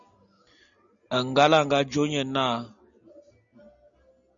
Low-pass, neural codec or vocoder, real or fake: 7.2 kHz; none; real